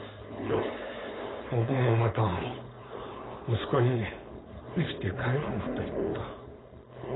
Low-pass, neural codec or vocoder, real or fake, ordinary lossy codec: 7.2 kHz; codec, 16 kHz, 4.8 kbps, FACodec; fake; AAC, 16 kbps